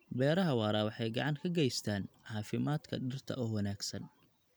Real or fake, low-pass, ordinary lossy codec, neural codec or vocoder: real; none; none; none